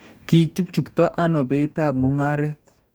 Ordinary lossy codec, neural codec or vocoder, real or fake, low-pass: none; codec, 44.1 kHz, 2.6 kbps, DAC; fake; none